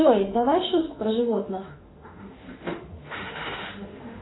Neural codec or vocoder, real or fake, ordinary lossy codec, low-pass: autoencoder, 48 kHz, 32 numbers a frame, DAC-VAE, trained on Japanese speech; fake; AAC, 16 kbps; 7.2 kHz